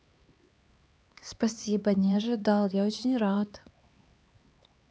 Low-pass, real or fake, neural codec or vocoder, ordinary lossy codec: none; fake; codec, 16 kHz, 4 kbps, X-Codec, HuBERT features, trained on LibriSpeech; none